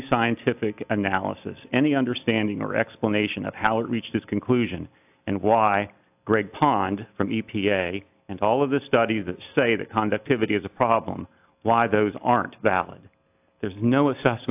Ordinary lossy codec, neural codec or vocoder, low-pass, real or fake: AAC, 32 kbps; none; 3.6 kHz; real